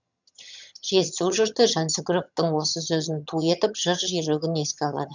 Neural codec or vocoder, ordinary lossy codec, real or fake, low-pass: vocoder, 22.05 kHz, 80 mel bands, HiFi-GAN; none; fake; 7.2 kHz